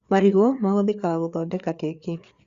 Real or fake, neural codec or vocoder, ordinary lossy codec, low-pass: fake; codec, 16 kHz, 4 kbps, FreqCodec, larger model; Opus, 64 kbps; 7.2 kHz